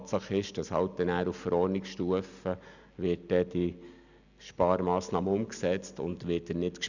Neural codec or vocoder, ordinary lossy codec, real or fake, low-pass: none; none; real; 7.2 kHz